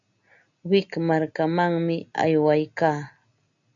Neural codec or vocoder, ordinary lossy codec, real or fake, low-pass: none; AAC, 64 kbps; real; 7.2 kHz